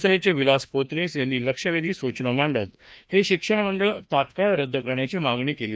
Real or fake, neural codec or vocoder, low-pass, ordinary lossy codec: fake; codec, 16 kHz, 1 kbps, FreqCodec, larger model; none; none